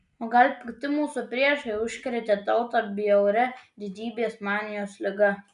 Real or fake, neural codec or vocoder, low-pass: real; none; 10.8 kHz